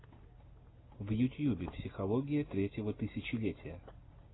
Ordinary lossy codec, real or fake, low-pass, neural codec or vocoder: AAC, 16 kbps; real; 7.2 kHz; none